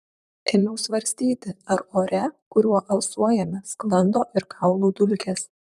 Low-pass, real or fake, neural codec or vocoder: 14.4 kHz; fake; vocoder, 44.1 kHz, 128 mel bands, Pupu-Vocoder